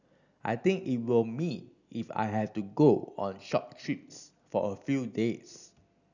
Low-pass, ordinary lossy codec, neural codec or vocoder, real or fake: 7.2 kHz; none; none; real